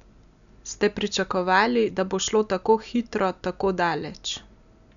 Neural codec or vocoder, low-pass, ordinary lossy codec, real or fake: none; 7.2 kHz; none; real